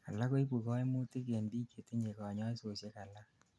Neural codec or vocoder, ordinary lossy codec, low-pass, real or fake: none; none; none; real